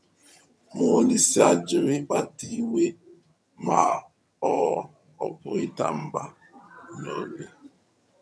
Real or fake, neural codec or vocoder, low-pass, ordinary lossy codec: fake; vocoder, 22.05 kHz, 80 mel bands, HiFi-GAN; none; none